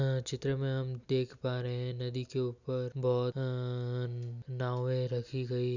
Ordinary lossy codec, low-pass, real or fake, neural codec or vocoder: none; 7.2 kHz; real; none